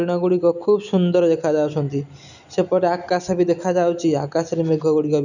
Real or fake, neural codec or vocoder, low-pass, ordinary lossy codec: real; none; 7.2 kHz; none